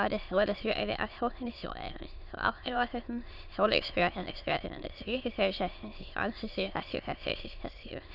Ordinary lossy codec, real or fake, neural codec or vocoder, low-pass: none; fake; autoencoder, 22.05 kHz, a latent of 192 numbers a frame, VITS, trained on many speakers; 5.4 kHz